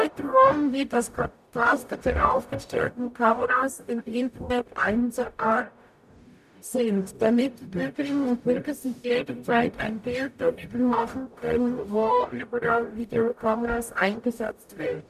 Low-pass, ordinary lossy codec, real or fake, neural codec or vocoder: 14.4 kHz; none; fake; codec, 44.1 kHz, 0.9 kbps, DAC